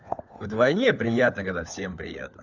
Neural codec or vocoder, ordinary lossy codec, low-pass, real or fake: codec, 16 kHz, 4 kbps, FunCodec, trained on LibriTTS, 50 frames a second; none; 7.2 kHz; fake